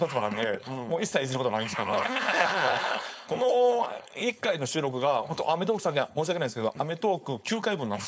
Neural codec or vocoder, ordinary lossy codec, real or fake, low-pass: codec, 16 kHz, 4.8 kbps, FACodec; none; fake; none